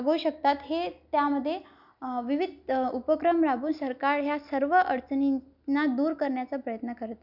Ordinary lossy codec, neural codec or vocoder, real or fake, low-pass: none; none; real; 5.4 kHz